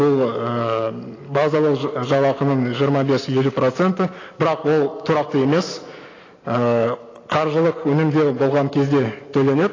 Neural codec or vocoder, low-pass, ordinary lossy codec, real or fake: none; 7.2 kHz; AAC, 32 kbps; real